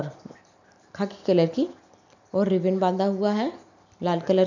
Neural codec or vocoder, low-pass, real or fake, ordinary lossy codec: none; 7.2 kHz; real; none